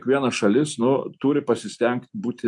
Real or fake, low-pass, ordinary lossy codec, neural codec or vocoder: real; 10.8 kHz; MP3, 64 kbps; none